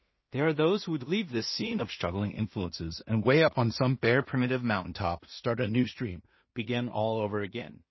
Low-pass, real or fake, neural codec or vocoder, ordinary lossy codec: 7.2 kHz; fake; codec, 16 kHz in and 24 kHz out, 0.4 kbps, LongCat-Audio-Codec, two codebook decoder; MP3, 24 kbps